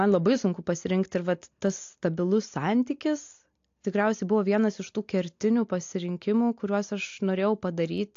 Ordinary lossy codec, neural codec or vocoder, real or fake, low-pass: AAC, 48 kbps; none; real; 7.2 kHz